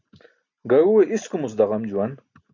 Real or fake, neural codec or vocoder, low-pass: real; none; 7.2 kHz